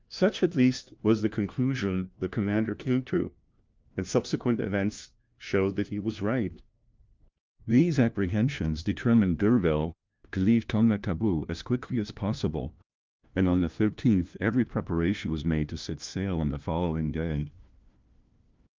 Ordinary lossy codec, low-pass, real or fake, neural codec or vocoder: Opus, 24 kbps; 7.2 kHz; fake; codec, 16 kHz, 1 kbps, FunCodec, trained on LibriTTS, 50 frames a second